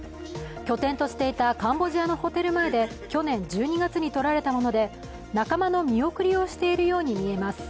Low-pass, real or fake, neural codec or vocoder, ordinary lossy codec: none; real; none; none